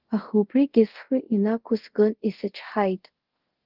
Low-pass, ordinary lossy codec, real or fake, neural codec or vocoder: 5.4 kHz; Opus, 16 kbps; fake; codec, 24 kHz, 0.5 kbps, DualCodec